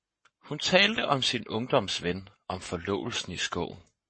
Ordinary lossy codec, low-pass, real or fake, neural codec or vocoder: MP3, 32 kbps; 9.9 kHz; fake; codec, 44.1 kHz, 7.8 kbps, Pupu-Codec